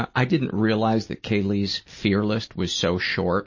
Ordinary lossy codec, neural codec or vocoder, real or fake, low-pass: MP3, 32 kbps; none; real; 7.2 kHz